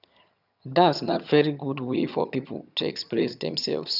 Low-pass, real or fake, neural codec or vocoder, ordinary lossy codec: 5.4 kHz; fake; vocoder, 22.05 kHz, 80 mel bands, HiFi-GAN; none